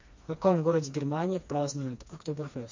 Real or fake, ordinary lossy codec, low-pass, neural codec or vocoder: fake; MP3, 48 kbps; 7.2 kHz; codec, 16 kHz, 2 kbps, FreqCodec, smaller model